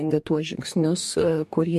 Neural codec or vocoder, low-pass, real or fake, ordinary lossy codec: codec, 44.1 kHz, 2.6 kbps, DAC; 14.4 kHz; fake; MP3, 64 kbps